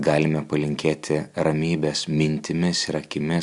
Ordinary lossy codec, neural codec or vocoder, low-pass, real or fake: MP3, 96 kbps; none; 10.8 kHz; real